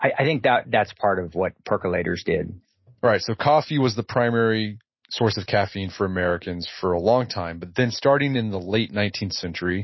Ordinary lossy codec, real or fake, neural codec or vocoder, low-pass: MP3, 24 kbps; real; none; 7.2 kHz